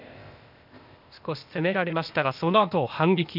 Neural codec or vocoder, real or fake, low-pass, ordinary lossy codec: codec, 16 kHz, 0.8 kbps, ZipCodec; fake; 5.4 kHz; none